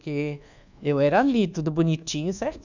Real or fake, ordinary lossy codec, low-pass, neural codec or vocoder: fake; none; 7.2 kHz; codec, 24 kHz, 1.2 kbps, DualCodec